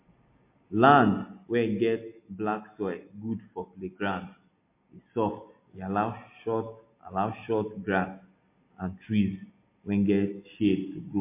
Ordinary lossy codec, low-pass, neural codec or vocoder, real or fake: MP3, 24 kbps; 3.6 kHz; none; real